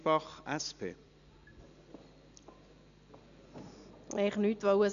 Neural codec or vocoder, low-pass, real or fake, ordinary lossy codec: none; 7.2 kHz; real; none